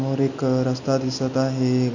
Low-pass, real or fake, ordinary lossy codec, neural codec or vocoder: 7.2 kHz; real; none; none